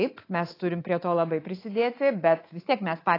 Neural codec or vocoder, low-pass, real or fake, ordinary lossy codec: codec, 24 kHz, 3.1 kbps, DualCodec; 5.4 kHz; fake; AAC, 24 kbps